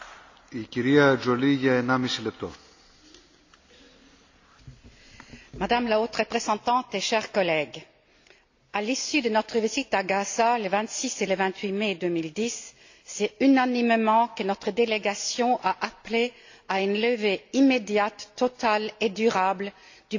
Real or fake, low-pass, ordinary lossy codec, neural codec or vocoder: real; 7.2 kHz; AAC, 48 kbps; none